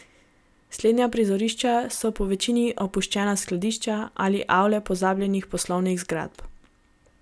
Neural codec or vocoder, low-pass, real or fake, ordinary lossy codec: none; none; real; none